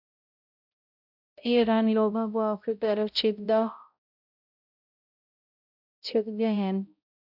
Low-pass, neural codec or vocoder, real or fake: 5.4 kHz; codec, 16 kHz, 0.5 kbps, X-Codec, HuBERT features, trained on balanced general audio; fake